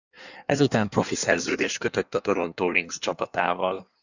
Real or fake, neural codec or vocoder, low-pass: fake; codec, 16 kHz in and 24 kHz out, 1.1 kbps, FireRedTTS-2 codec; 7.2 kHz